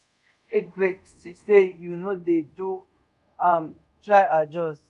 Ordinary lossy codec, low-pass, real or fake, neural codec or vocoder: none; 10.8 kHz; fake; codec, 24 kHz, 0.5 kbps, DualCodec